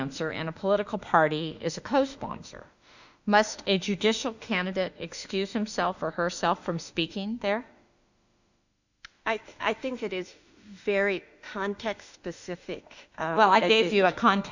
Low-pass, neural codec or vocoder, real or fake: 7.2 kHz; autoencoder, 48 kHz, 32 numbers a frame, DAC-VAE, trained on Japanese speech; fake